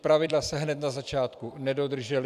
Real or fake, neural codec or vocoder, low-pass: fake; vocoder, 44.1 kHz, 128 mel bands every 256 samples, BigVGAN v2; 14.4 kHz